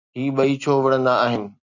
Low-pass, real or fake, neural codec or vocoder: 7.2 kHz; real; none